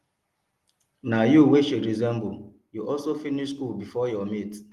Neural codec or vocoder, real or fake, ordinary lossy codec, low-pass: none; real; Opus, 24 kbps; 14.4 kHz